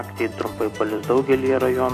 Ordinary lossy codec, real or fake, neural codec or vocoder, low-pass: AAC, 64 kbps; fake; vocoder, 44.1 kHz, 128 mel bands every 256 samples, BigVGAN v2; 14.4 kHz